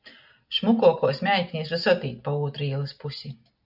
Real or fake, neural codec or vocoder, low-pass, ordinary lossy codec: real; none; 5.4 kHz; AAC, 48 kbps